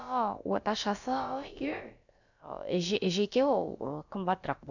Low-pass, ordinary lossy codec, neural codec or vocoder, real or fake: 7.2 kHz; none; codec, 16 kHz, about 1 kbps, DyCAST, with the encoder's durations; fake